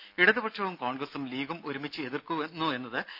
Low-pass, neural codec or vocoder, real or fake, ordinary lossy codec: 5.4 kHz; none; real; none